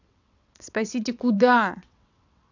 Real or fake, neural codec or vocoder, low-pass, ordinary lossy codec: fake; vocoder, 22.05 kHz, 80 mel bands, WaveNeXt; 7.2 kHz; none